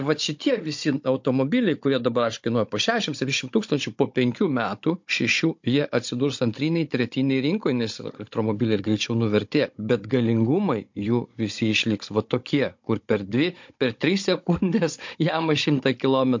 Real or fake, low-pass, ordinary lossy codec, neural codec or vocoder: fake; 7.2 kHz; MP3, 48 kbps; codec, 16 kHz, 16 kbps, FunCodec, trained on Chinese and English, 50 frames a second